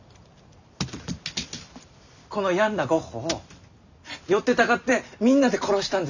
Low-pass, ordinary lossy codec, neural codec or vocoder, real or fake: 7.2 kHz; none; none; real